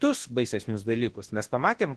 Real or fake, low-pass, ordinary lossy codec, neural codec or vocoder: fake; 10.8 kHz; Opus, 16 kbps; codec, 24 kHz, 0.9 kbps, WavTokenizer, large speech release